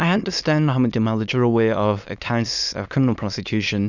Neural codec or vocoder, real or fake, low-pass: autoencoder, 22.05 kHz, a latent of 192 numbers a frame, VITS, trained on many speakers; fake; 7.2 kHz